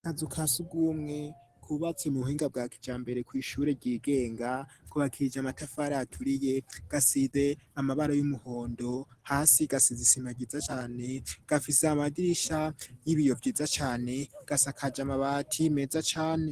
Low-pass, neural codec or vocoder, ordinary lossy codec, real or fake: 14.4 kHz; none; Opus, 16 kbps; real